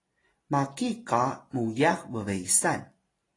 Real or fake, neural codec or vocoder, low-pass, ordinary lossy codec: real; none; 10.8 kHz; AAC, 32 kbps